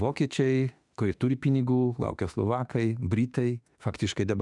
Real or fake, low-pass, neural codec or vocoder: fake; 10.8 kHz; autoencoder, 48 kHz, 32 numbers a frame, DAC-VAE, trained on Japanese speech